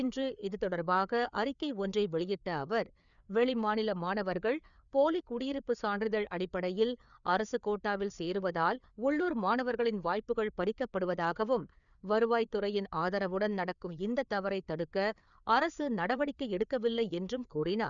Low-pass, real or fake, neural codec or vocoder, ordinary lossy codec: 7.2 kHz; fake; codec, 16 kHz, 4 kbps, FreqCodec, larger model; none